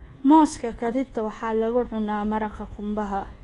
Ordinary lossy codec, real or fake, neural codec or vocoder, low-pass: AAC, 32 kbps; fake; codec, 24 kHz, 1.2 kbps, DualCodec; 10.8 kHz